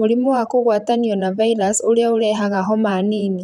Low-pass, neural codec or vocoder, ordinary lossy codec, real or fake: 19.8 kHz; vocoder, 44.1 kHz, 128 mel bands, Pupu-Vocoder; none; fake